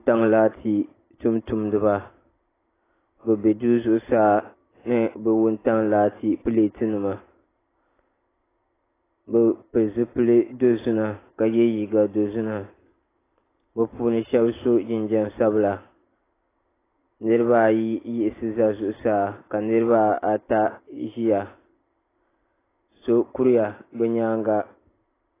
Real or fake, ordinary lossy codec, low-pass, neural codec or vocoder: real; AAC, 16 kbps; 3.6 kHz; none